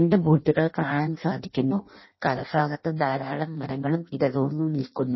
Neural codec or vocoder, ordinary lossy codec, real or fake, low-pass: codec, 16 kHz in and 24 kHz out, 0.6 kbps, FireRedTTS-2 codec; MP3, 24 kbps; fake; 7.2 kHz